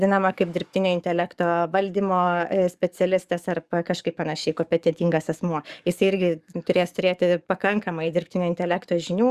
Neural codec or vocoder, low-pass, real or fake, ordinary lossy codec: codec, 44.1 kHz, 7.8 kbps, DAC; 14.4 kHz; fake; Opus, 64 kbps